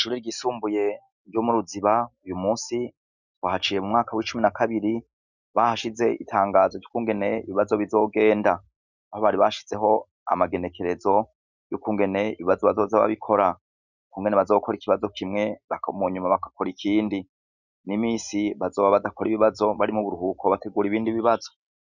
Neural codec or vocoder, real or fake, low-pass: none; real; 7.2 kHz